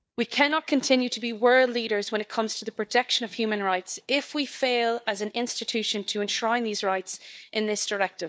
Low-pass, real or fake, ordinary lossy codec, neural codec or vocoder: none; fake; none; codec, 16 kHz, 4 kbps, FunCodec, trained on Chinese and English, 50 frames a second